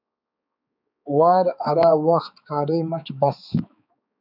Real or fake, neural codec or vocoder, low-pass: fake; codec, 16 kHz, 4 kbps, X-Codec, HuBERT features, trained on balanced general audio; 5.4 kHz